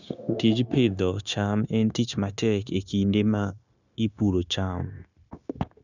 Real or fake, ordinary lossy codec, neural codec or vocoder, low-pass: fake; none; codec, 16 kHz, 0.9 kbps, LongCat-Audio-Codec; 7.2 kHz